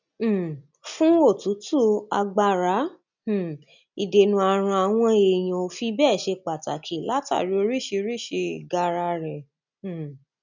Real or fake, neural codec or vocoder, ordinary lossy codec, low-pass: real; none; none; 7.2 kHz